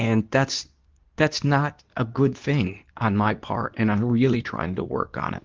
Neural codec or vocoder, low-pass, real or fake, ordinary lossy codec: codec, 24 kHz, 0.9 kbps, WavTokenizer, medium speech release version 2; 7.2 kHz; fake; Opus, 32 kbps